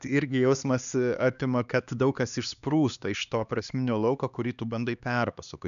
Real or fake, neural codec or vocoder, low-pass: fake; codec, 16 kHz, 2 kbps, X-Codec, HuBERT features, trained on LibriSpeech; 7.2 kHz